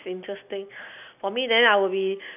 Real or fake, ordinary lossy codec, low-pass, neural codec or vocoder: real; none; 3.6 kHz; none